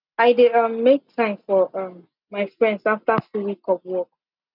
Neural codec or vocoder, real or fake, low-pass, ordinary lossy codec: none; real; 5.4 kHz; none